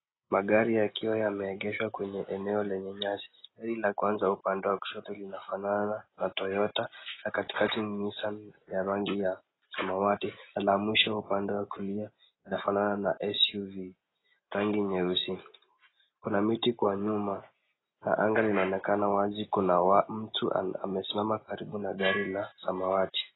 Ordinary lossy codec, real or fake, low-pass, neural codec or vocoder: AAC, 16 kbps; real; 7.2 kHz; none